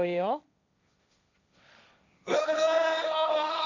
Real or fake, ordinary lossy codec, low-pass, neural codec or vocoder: fake; none; 7.2 kHz; codec, 16 kHz, 1.1 kbps, Voila-Tokenizer